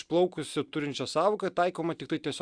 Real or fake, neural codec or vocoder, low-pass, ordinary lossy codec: real; none; 9.9 kHz; Opus, 64 kbps